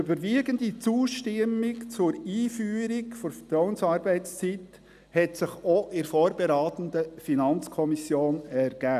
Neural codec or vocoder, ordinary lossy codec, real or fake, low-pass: none; none; real; 14.4 kHz